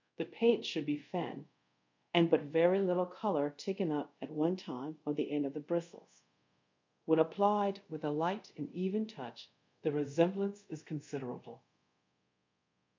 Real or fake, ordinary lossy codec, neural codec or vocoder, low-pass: fake; MP3, 64 kbps; codec, 24 kHz, 0.5 kbps, DualCodec; 7.2 kHz